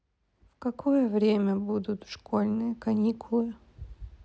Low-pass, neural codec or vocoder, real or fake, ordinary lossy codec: none; none; real; none